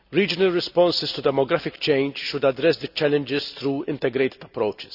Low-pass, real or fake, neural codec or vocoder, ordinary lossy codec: 5.4 kHz; real; none; none